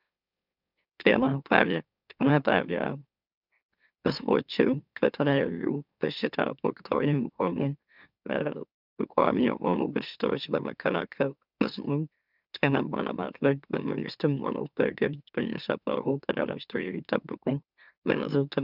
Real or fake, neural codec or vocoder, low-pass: fake; autoencoder, 44.1 kHz, a latent of 192 numbers a frame, MeloTTS; 5.4 kHz